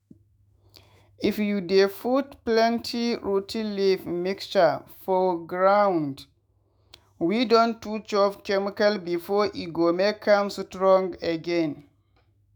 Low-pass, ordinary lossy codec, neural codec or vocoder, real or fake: none; none; autoencoder, 48 kHz, 128 numbers a frame, DAC-VAE, trained on Japanese speech; fake